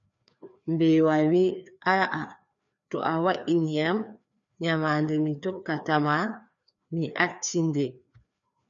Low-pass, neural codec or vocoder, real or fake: 7.2 kHz; codec, 16 kHz, 2 kbps, FreqCodec, larger model; fake